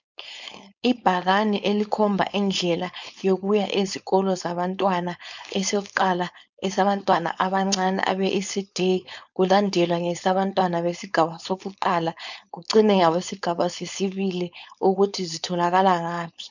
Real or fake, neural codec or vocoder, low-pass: fake; codec, 16 kHz, 4.8 kbps, FACodec; 7.2 kHz